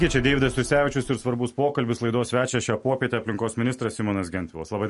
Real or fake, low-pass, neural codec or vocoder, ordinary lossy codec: real; 14.4 kHz; none; MP3, 48 kbps